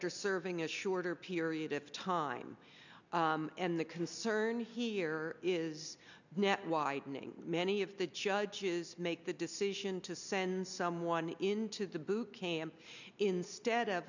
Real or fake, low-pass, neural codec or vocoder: real; 7.2 kHz; none